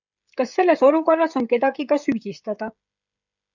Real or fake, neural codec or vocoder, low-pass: fake; codec, 16 kHz, 16 kbps, FreqCodec, smaller model; 7.2 kHz